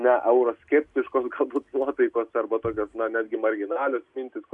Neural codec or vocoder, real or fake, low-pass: none; real; 10.8 kHz